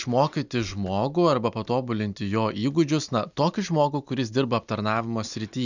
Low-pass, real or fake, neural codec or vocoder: 7.2 kHz; real; none